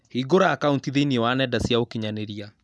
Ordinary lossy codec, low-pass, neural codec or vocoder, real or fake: none; none; none; real